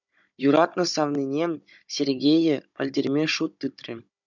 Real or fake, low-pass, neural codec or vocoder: fake; 7.2 kHz; codec, 16 kHz, 16 kbps, FunCodec, trained on Chinese and English, 50 frames a second